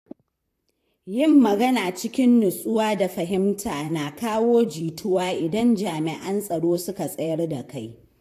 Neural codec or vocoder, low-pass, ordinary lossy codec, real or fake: vocoder, 44.1 kHz, 128 mel bands, Pupu-Vocoder; 14.4 kHz; AAC, 64 kbps; fake